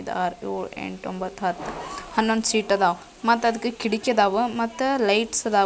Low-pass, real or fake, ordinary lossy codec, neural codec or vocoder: none; real; none; none